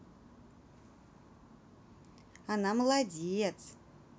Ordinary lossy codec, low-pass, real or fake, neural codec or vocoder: none; none; real; none